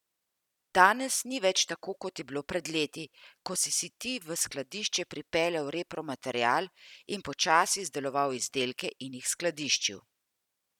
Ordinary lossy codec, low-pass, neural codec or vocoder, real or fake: none; 19.8 kHz; vocoder, 44.1 kHz, 128 mel bands every 256 samples, BigVGAN v2; fake